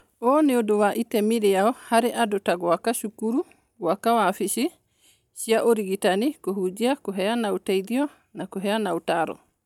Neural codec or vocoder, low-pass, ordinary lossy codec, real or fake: none; 19.8 kHz; none; real